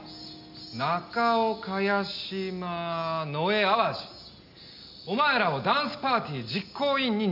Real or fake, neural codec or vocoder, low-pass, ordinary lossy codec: real; none; 5.4 kHz; none